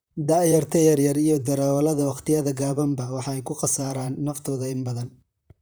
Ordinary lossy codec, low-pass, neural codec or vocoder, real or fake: none; none; vocoder, 44.1 kHz, 128 mel bands, Pupu-Vocoder; fake